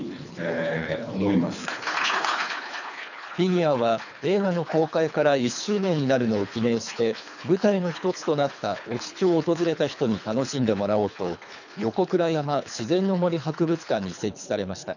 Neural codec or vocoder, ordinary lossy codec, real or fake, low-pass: codec, 24 kHz, 3 kbps, HILCodec; none; fake; 7.2 kHz